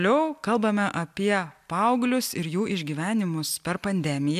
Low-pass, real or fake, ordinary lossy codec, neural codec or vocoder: 14.4 kHz; real; MP3, 96 kbps; none